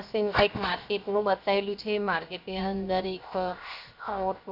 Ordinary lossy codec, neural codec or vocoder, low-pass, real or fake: none; codec, 16 kHz, 0.7 kbps, FocalCodec; 5.4 kHz; fake